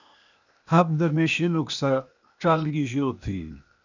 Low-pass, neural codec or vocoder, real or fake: 7.2 kHz; codec, 16 kHz, 0.8 kbps, ZipCodec; fake